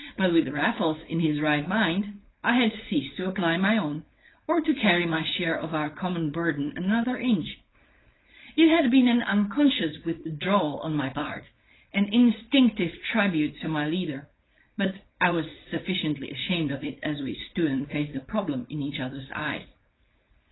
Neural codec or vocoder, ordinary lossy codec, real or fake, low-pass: codec, 16 kHz, 4.8 kbps, FACodec; AAC, 16 kbps; fake; 7.2 kHz